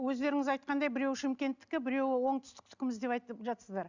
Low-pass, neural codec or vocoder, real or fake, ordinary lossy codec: 7.2 kHz; none; real; Opus, 64 kbps